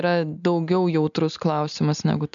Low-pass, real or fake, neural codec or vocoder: 7.2 kHz; real; none